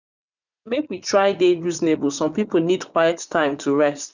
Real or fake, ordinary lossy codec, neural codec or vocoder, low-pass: fake; none; vocoder, 44.1 kHz, 80 mel bands, Vocos; 7.2 kHz